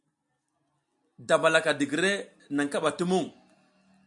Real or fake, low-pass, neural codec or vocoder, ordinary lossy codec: real; 10.8 kHz; none; MP3, 64 kbps